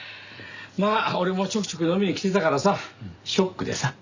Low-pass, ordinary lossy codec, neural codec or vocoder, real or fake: 7.2 kHz; Opus, 64 kbps; none; real